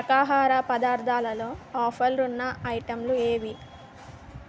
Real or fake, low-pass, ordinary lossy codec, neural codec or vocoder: real; none; none; none